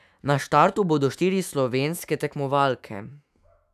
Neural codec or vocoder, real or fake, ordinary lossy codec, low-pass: autoencoder, 48 kHz, 128 numbers a frame, DAC-VAE, trained on Japanese speech; fake; none; 14.4 kHz